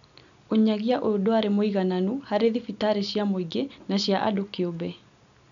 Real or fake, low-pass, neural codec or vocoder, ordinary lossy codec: real; 7.2 kHz; none; none